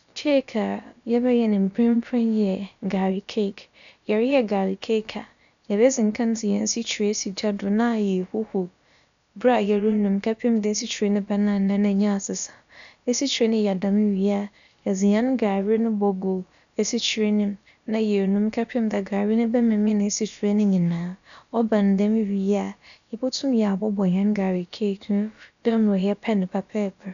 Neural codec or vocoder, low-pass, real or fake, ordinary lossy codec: codec, 16 kHz, about 1 kbps, DyCAST, with the encoder's durations; 7.2 kHz; fake; none